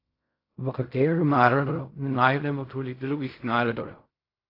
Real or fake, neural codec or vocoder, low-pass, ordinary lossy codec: fake; codec, 16 kHz in and 24 kHz out, 0.4 kbps, LongCat-Audio-Codec, fine tuned four codebook decoder; 5.4 kHz; AAC, 32 kbps